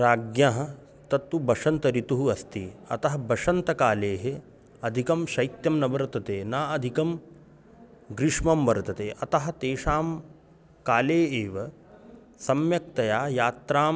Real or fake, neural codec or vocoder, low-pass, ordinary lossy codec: real; none; none; none